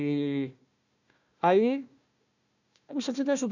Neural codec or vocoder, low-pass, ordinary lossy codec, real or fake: codec, 16 kHz, 1 kbps, FunCodec, trained on Chinese and English, 50 frames a second; 7.2 kHz; none; fake